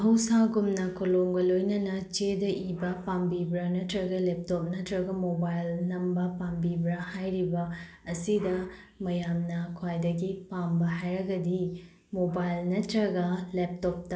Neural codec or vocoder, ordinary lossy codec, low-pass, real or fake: none; none; none; real